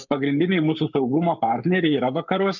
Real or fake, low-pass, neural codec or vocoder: fake; 7.2 kHz; codec, 44.1 kHz, 7.8 kbps, Pupu-Codec